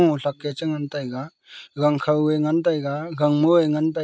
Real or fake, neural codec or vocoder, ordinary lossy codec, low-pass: real; none; none; none